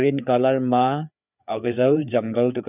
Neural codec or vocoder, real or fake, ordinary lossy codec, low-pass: codec, 16 kHz, 4 kbps, FreqCodec, larger model; fake; none; 3.6 kHz